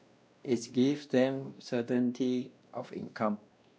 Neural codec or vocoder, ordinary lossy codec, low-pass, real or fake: codec, 16 kHz, 1 kbps, X-Codec, WavLM features, trained on Multilingual LibriSpeech; none; none; fake